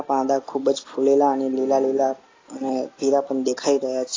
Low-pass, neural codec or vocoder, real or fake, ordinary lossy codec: 7.2 kHz; none; real; AAC, 32 kbps